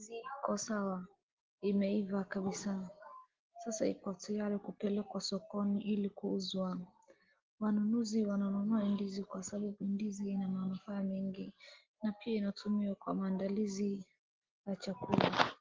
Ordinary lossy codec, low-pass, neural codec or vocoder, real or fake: Opus, 16 kbps; 7.2 kHz; none; real